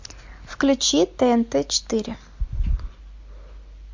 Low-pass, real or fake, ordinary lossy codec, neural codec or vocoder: 7.2 kHz; real; MP3, 48 kbps; none